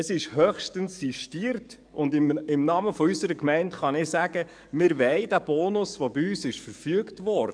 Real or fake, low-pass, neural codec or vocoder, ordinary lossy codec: fake; 9.9 kHz; codec, 44.1 kHz, 7.8 kbps, DAC; none